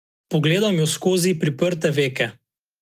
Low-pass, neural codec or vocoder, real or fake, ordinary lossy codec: 14.4 kHz; none; real; Opus, 32 kbps